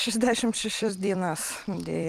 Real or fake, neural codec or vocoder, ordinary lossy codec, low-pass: fake; vocoder, 44.1 kHz, 128 mel bands every 256 samples, BigVGAN v2; Opus, 24 kbps; 14.4 kHz